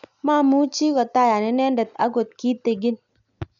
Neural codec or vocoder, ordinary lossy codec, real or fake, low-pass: none; none; real; 7.2 kHz